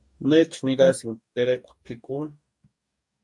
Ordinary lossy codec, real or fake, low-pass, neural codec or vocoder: MP3, 64 kbps; fake; 10.8 kHz; codec, 44.1 kHz, 2.6 kbps, DAC